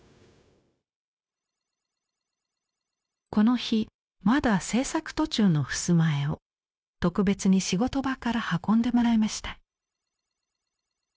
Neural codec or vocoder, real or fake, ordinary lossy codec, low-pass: codec, 16 kHz, 0.9 kbps, LongCat-Audio-Codec; fake; none; none